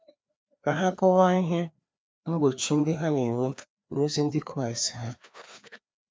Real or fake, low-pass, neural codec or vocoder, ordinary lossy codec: fake; none; codec, 16 kHz, 2 kbps, FreqCodec, larger model; none